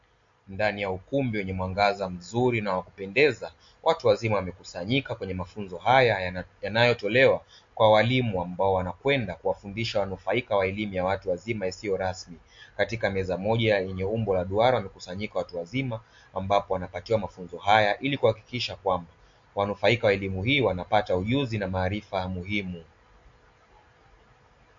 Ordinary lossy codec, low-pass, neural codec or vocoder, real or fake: MP3, 48 kbps; 7.2 kHz; none; real